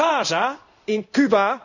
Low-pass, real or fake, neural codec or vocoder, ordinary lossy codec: 7.2 kHz; fake; codec, 16 kHz in and 24 kHz out, 1 kbps, XY-Tokenizer; none